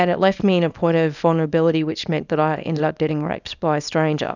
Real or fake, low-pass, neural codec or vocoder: fake; 7.2 kHz; codec, 24 kHz, 0.9 kbps, WavTokenizer, small release